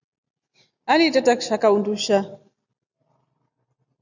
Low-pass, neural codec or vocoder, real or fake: 7.2 kHz; none; real